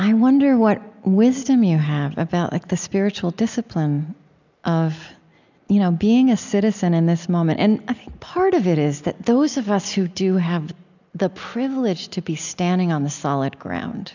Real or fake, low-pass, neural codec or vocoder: real; 7.2 kHz; none